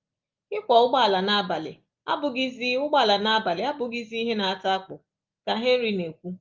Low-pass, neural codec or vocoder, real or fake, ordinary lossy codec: 7.2 kHz; none; real; Opus, 32 kbps